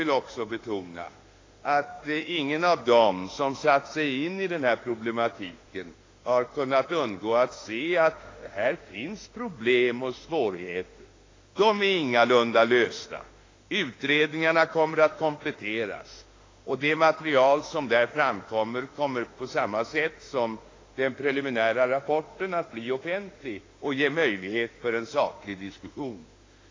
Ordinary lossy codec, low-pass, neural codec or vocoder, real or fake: AAC, 32 kbps; 7.2 kHz; autoencoder, 48 kHz, 32 numbers a frame, DAC-VAE, trained on Japanese speech; fake